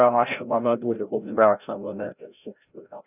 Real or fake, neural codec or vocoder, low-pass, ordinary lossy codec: fake; codec, 16 kHz, 0.5 kbps, FreqCodec, larger model; 3.6 kHz; none